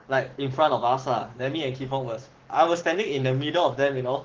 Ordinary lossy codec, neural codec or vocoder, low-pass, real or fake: Opus, 16 kbps; codec, 44.1 kHz, 7.8 kbps, Pupu-Codec; 7.2 kHz; fake